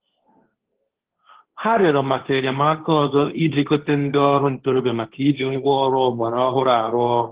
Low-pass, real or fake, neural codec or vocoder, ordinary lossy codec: 3.6 kHz; fake; codec, 16 kHz, 1.1 kbps, Voila-Tokenizer; Opus, 16 kbps